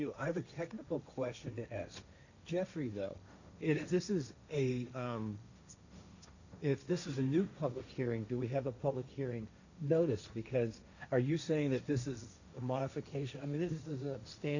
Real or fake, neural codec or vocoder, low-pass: fake; codec, 16 kHz, 1.1 kbps, Voila-Tokenizer; 7.2 kHz